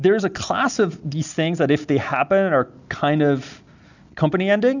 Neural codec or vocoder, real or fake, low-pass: none; real; 7.2 kHz